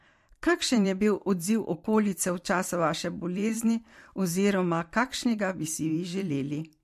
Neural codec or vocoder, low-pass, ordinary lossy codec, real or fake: vocoder, 44.1 kHz, 128 mel bands every 512 samples, BigVGAN v2; 14.4 kHz; MP3, 64 kbps; fake